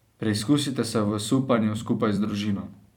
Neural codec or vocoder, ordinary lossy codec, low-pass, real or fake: vocoder, 44.1 kHz, 128 mel bands every 256 samples, BigVGAN v2; none; 19.8 kHz; fake